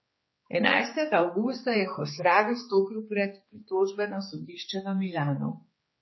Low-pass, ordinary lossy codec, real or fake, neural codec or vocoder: 7.2 kHz; MP3, 24 kbps; fake; codec, 16 kHz, 4 kbps, X-Codec, HuBERT features, trained on general audio